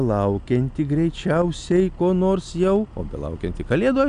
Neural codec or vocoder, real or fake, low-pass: none; real; 9.9 kHz